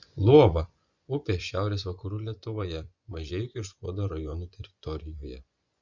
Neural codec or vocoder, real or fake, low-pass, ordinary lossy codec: vocoder, 24 kHz, 100 mel bands, Vocos; fake; 7.2 kHz; Opus, 64 kbps